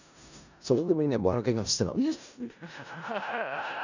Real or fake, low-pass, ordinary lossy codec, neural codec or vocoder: fake; 7.2 kHz; none; codec, 16 kHz in and 24 kHz out, 0.4 kbps, LongCat-Audio-Codec, four codebook decoder